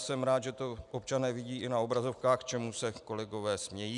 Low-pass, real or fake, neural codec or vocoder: 10.8 kHz; real; none